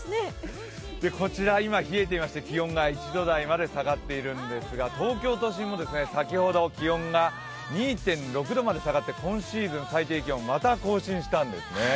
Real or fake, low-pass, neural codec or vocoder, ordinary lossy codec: real; none; none; none